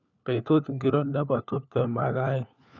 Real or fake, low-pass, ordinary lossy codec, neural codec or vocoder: fake; 7.2 kHz; none; codec, 16 kHz, 4 kbps, FunCodec, trained on LibriTTS, 50 frames a second